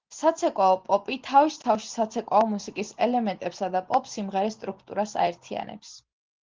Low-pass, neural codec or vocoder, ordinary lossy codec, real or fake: 7.2 kHz; none; Opus, 16 kbps; real